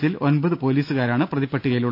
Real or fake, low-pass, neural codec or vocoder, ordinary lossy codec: real; 5.4 kHz; none; none